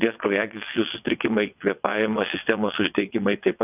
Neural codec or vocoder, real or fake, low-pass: vocoder, 22.05 kHz, 80 mel bands, WaveNeXt; fake; 3.6 kHz